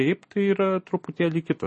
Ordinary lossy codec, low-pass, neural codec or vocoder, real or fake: MP3, 32 kbps; 9.9 kHz; none; real